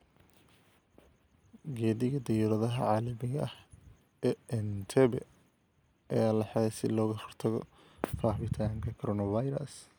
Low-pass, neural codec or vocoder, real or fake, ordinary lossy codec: none; none; real; none